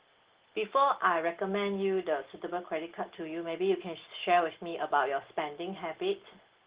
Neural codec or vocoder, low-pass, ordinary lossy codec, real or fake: none; 3.6 kHz; Opus, 16 kbps; real